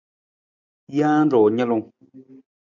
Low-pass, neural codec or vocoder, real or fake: 7.2 kHz; none; real